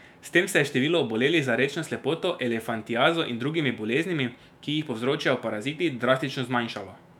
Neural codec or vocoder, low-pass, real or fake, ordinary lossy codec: autoencoder, 48 kHz, 128 numbers a frame, DAC-VAE, trained on Japanese speech; 19.8 kHz; fake; none